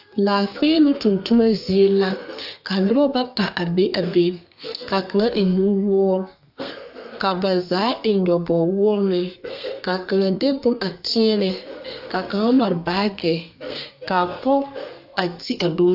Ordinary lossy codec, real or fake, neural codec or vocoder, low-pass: AAC, 48 kbps; fake; codec, 32 kHz, 1.9 kbps, SNAC; 5.4 kHz